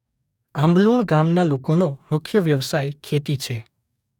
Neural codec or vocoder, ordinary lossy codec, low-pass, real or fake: codec, 44.1 kHz, 2.6 kbps, DAC; none; 19.8 kHz; fake